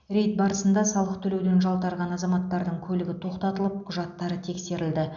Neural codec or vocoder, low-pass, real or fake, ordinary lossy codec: none; 7.2 kHz; real; none